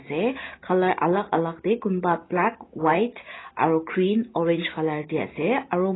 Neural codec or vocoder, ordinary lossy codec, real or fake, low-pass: none; AAC, 16 kbps; real; 7.2 kHz